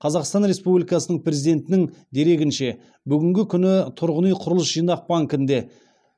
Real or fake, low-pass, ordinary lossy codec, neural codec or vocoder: real; none; none; none